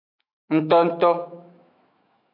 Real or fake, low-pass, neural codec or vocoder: fake; 5.4 kHz; autoencoder, 48 kHz, 128 numbers a frame, DAC-VAE, trained on Japanese speech